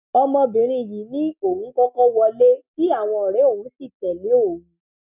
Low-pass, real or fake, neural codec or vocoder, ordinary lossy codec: 3.6 kHz; real; none; none